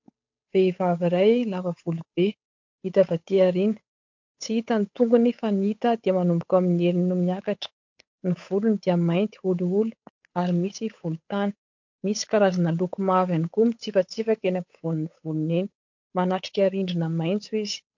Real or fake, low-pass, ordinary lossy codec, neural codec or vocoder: fake; 7.2 kHz; MP3, 48 kbps; codec, 16 kHz, 8 kbps, FunCodec, trained on Chinese and English, 25 frames a second